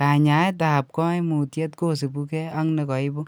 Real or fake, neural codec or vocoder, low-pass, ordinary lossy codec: real; none; none; none